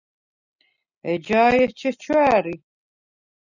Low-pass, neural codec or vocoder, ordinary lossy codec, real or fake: 7.2 kHz; none; Opus, 64 kbps; real